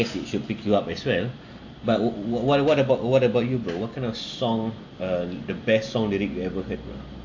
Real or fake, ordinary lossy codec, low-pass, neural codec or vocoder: real; AAC, 48 kbps; 7.2 kHz; none